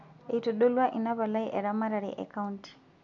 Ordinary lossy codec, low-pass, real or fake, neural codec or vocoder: none; 7.2 kHz; real; none